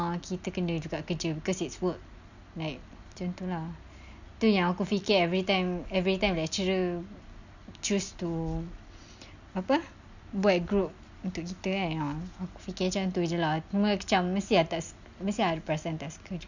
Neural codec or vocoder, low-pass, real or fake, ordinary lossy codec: none; 7.2 kHz; real; none